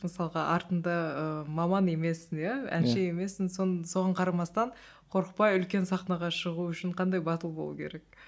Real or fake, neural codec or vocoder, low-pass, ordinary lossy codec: real; none; none; none